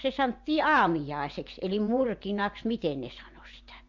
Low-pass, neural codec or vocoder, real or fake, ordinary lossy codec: 7.2 kHz; vocoder, 24 kHz, 100 mel bands, Vocos; fake; MP3, 64 kbps